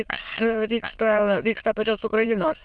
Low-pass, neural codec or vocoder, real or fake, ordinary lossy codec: 9.9 kHz; autoencoder, 22.05 kHz, a latent of 192 numbers a frame, VITS, trained on many speakers; fake; Opus, 32 kbps